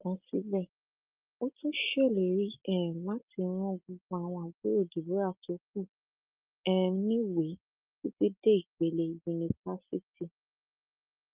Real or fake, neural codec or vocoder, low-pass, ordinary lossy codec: real; none; 3.6 kHz; Opus, 24 kbps